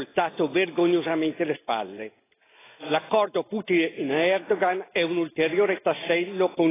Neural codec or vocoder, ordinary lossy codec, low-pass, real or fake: none; AAC, 16 kbps; 3.6 kHz; real